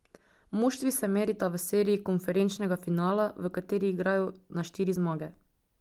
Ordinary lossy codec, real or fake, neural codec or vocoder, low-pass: Opus, 24 kbps; real; none; 19.8 kHz